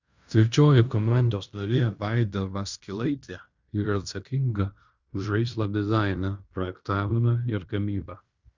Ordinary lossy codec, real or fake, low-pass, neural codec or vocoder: Opus, 64 kbps; fake; 7.2 kHz; codec, 16 kHz in and 24 kHz out, 0.9 kbps, LongCat-Audio-Codec, fine tuned four codebook decoder